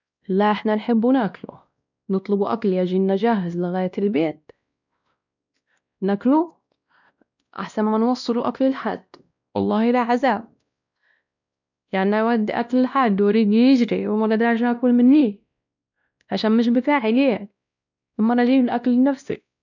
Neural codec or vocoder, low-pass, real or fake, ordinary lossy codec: codec, 16 kHz, 1 kbps, X-Codec, WavLM features, trained on Multilingual LibriSpeech; 7.2 kHz; fake; none